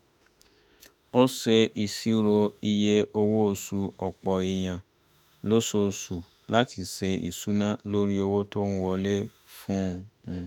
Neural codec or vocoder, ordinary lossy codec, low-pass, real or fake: autoencoder, 48 kHz, 32 numbers a frame, DAC-VAE, trained on Japanese speech; none; 19.8 kHz; fake